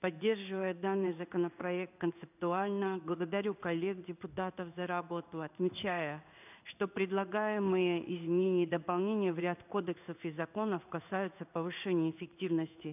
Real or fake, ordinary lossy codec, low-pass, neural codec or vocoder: fake; none; 3.6 kHz; codec, 16 kHz in and 24 kHz out, 1 kbps, XY-Tokenizer